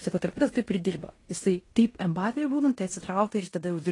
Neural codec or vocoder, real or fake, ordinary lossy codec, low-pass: codec, 16 kHz in and 24 kHz out, 0.9 kbps, LongCat-Audio-Codec, fine tuned four codebook decoder; fake; AAC, 32 kbps; 10.8 kHz